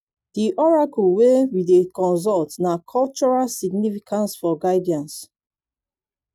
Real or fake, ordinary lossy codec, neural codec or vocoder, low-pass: real; none; none; 19.8 kHz